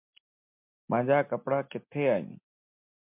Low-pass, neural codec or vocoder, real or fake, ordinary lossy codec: 3.6 kHz; none; real; MP3, 32 kbps